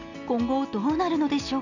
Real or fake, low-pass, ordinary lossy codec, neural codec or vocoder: real; 7.2 kHz; none; none